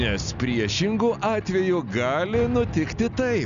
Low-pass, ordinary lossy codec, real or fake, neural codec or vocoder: 7.2 kHz; MP3, 96 kbps; real; none